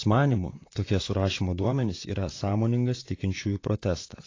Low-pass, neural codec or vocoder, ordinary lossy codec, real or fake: 7.2 kHz; vocoder, 44.1 kHz, 128 mel bands, Pupu-Vocoder; AAC, 32 kbps; fake